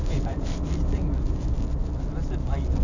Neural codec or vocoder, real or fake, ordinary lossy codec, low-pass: none; real; none; 7.2 kHz